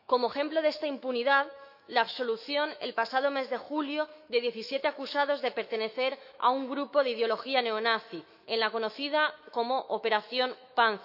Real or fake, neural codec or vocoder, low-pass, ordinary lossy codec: fake; autoencoder, 48 kHz, 128 numbers a frame, DAC-VAE, trained on Japanese speech; 5.4 kHz; none